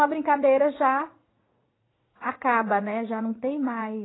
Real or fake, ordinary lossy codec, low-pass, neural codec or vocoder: fake; AAC, 16 kbps; 7.2 kHz; vocoder, 44.1 kHz, 80 mel bands, Vocos